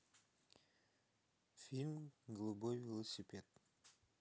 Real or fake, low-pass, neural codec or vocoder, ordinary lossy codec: real; none; none; none